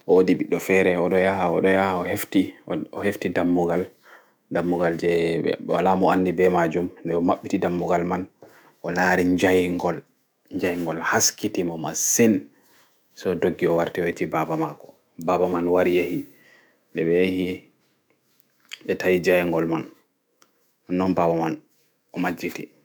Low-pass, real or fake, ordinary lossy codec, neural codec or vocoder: none; fake; none; autoencoder, 48 kHz, 128 numbers a frame, DAC-VAE, trained on Japanese speech